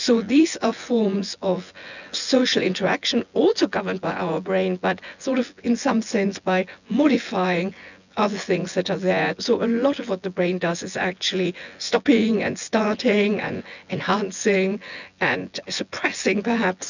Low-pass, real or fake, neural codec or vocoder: 7.2 kHz; fake; vocoder, 24 kHz, 100 mel bands, Vocos